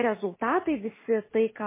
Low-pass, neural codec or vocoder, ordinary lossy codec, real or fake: 3.6 kHz; none; MP3, 16 kbps; real